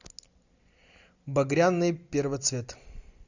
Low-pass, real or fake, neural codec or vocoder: 7.2 kHz; real; none